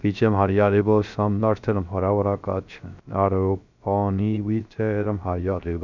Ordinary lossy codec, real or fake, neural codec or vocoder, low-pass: none; fake; codec, 16 kHz, 0.3 kbps, FocalCodec; 7.2 kHz